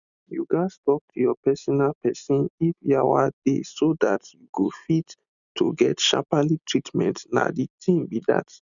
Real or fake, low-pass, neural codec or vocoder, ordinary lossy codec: real; 7.2 kHz; none; none